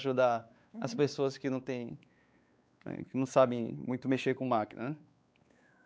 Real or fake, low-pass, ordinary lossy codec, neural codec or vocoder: fake; none; none; codec, 16 kHz, 4 kbps, X-Codec, WavLM features, trained on Multilingual LibriSpeech